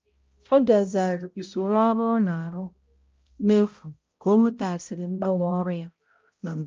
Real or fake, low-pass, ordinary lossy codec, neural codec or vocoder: fake; 7.2 kHz; Opus, 24 kbps; codec, 16 kHz, 0.5 kbps, X-Codec, HuBERT features, trained on balanced general audio